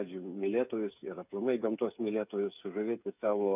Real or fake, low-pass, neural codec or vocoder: fake; 3.6 kHz; codec, 16 kHz, 8 kbps, FreqCodec, smaller model